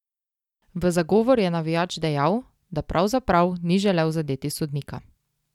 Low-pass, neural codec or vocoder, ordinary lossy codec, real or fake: 19.8 kHz; none; none; real